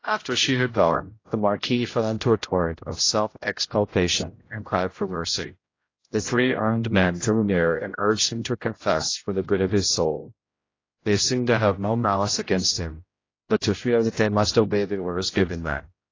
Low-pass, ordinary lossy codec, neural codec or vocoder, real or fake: 7.2 kHz; AAC, 32 kbps; codec, 16 kHz, 0.5 kbps, X-Codec, HuBERT features, trained on general audio; fake